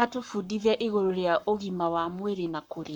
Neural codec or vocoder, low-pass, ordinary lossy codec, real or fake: codec, 44.1 kHz, 7.8 kbps, Pupu-Codec; 19.8 kHz; none; fake